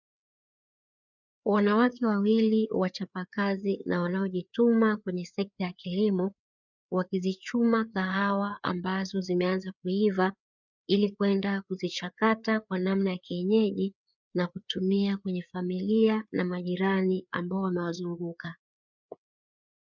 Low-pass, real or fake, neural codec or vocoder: 7.2 kHz; fake; codec, 16 kHz, 4 kbps, FreqCodec, larger model